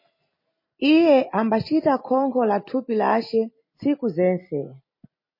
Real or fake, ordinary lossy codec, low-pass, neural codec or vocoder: real; MP3, 24 kbps; 5.4 kHz; none